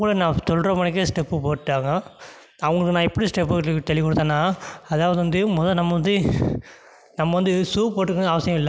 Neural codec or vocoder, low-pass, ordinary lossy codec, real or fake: none; none; none; real